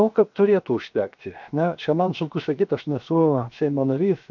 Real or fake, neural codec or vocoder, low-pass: fake; codec, 16 kHz, 0.7 kbps, FocalCodec; 7.2 kHz